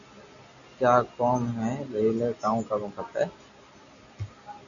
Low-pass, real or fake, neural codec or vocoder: 7.2 kHz; real; none